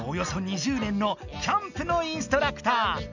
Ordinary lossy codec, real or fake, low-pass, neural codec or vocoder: none; real; 7.2 kHz; none